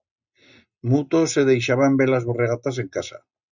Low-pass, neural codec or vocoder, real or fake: 7.2 kHz; none; real